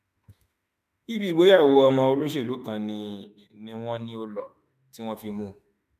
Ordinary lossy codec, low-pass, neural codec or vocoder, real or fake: none; 14.4 kHz; autoencoder, 48 kHz, 32 numbers a frame, DAC-VAE, trained on Japanese speech; fake